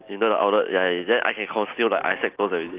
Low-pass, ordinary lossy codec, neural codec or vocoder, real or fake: 3.6 kHz; Opus, 32 kbps; none; real